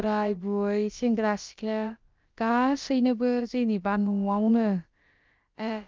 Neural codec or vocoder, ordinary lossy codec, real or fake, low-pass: codec, 16 kHz, about 1 kbps, DyCAST, with the encoder's durations; Opus, 24 kbps; fake; 7.2 kHz